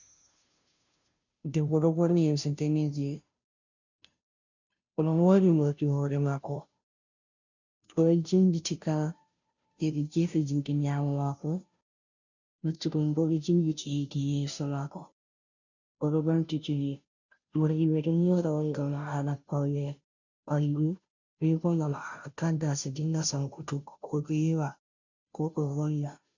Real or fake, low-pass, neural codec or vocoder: fake; 7.2 kHz; codec, 16 kHz, 0.5 kbps, FunCodec, trained on Chinese and English, 25 frames a second